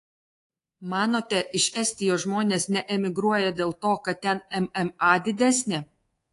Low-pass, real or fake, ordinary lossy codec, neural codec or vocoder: 10.8 kHz; fake; AAC, 48 kbps; codec, 24 kHz, 3.1 kbps, DualCodec